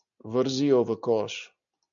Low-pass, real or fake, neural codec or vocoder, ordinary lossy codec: 7.2 kHz; real; none; AAC, 64 kbps